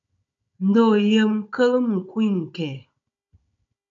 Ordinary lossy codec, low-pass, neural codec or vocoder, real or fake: MP3, 64 kbps; 7.2 kHz; codec, 16 kHz, 16 kbps, FunCodec, trained on Chinese and English, 50 frames a second; fake